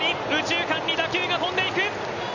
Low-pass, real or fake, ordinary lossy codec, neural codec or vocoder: 7.2 kHz; real; none; none